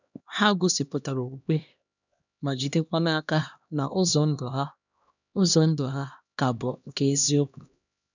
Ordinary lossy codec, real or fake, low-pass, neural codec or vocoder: none; fake; 7.2 kHz; codec, 16 kHz, 1 kbps, X-Codec, HuBERT features, trained on LibriSpeech